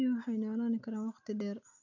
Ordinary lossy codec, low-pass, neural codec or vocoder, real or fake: none; 7.2 kHz; none; real